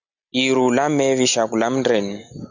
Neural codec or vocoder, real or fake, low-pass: none; real; 7.2 kHz